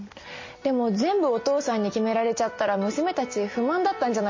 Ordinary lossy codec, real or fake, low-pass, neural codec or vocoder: MP3, 32 kbps; real; 7.2 kHz; none